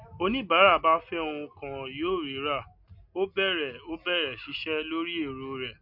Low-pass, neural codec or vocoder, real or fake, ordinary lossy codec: 5.4 kHz; none; real; MP3, 48 kbps